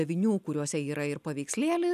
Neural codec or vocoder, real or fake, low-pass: none; real; 14.4 kHz